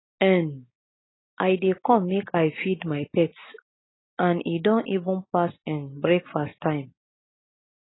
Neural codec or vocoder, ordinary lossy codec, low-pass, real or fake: none; AAC, 16 kbps; 7.2 kHz; real